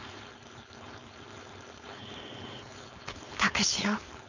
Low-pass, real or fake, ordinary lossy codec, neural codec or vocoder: 7.2 kHz; fake; none; codec, 16 kHz, 4.8 kbps, FACodec